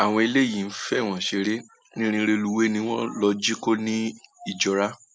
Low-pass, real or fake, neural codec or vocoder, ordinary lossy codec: none; real; none; none